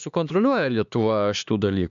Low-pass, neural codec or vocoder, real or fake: 7.2 kHz; codec, 16 kHz, 2 kbps, X-Codec, HuBERT features, trained on LibriSpeech; fake